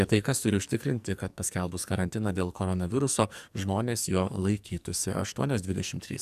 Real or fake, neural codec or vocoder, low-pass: fake; codec, 44.1 kHz, 2.6 kbps, SNAC; 14.4 kHz